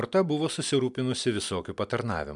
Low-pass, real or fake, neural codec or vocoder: 10.8 kHz; real; none